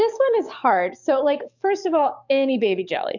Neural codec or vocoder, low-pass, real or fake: codec, 44.1 kHz, 7.8 kbps, DAC; 7.2 kHz; fake